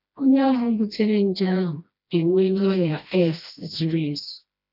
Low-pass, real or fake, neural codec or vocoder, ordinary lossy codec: 5.4 kHz; fake; codec, 16 kHz, 1 kbps, FreqCodec, smaller model; none